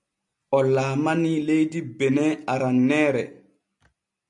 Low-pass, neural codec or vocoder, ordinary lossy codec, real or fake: 10.8 kHz; none; MP3, 64 kbps; real